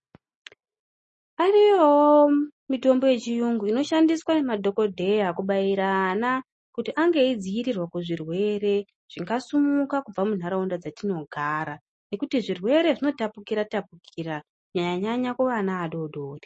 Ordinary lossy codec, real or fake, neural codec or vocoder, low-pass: MP3, 32 kbps; real; none; 10.8 kHz